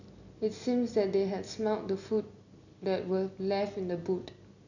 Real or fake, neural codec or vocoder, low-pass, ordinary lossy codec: real; none; 7.2 kHz; none